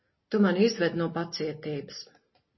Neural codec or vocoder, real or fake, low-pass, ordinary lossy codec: none; real; 7.2 kHz; MP3, 24 kbps